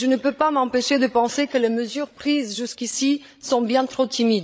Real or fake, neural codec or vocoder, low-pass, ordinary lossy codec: fake; codec, 16 kHz, 16 kbps, FreqCodec, larger model; none; none